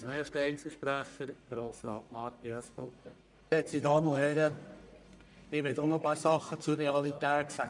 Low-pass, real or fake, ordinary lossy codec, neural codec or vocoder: 10.8 kHz; fake; none; codec, 44.1 kHz, 1.7 kbps, Pupu-Codec